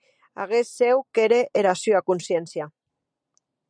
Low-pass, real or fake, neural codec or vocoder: 9.9 kHz; real; none